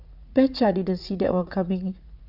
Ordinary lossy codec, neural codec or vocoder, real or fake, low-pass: none; vocoder, 44.1 kHz, 80 mel bands, Vocos; fake; 5.4 kHz